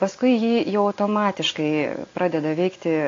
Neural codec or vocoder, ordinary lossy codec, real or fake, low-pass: none; AAC, 32 kbps; real; 7.2 kHz